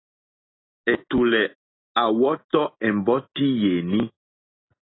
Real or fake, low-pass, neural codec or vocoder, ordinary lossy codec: real; 7.2 kHz; none; AAC, 16 kbps